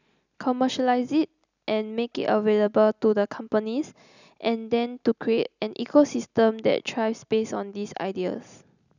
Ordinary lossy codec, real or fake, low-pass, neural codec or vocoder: none; real; 7.2 kHz; none